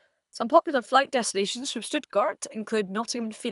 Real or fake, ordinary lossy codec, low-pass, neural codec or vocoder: fake; none; 10.8 kHz; codec, 24 kHz, 3 kbps, HILCodec